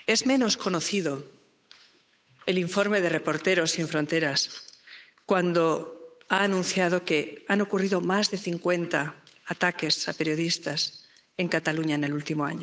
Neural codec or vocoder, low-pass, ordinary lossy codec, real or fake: codec, 16 kHz, 8 kbps, FunCodec, trained on Chinese and English, 25 frames a second; none; none; fake